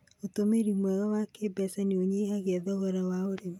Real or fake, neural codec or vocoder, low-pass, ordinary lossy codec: real; none; 19.8 kHz; none